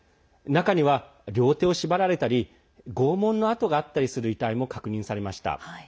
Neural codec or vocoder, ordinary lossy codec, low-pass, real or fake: none; none; none; real